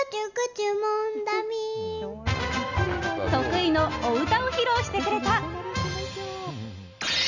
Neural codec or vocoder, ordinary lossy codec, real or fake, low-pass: none; none; real; 7.2 kHz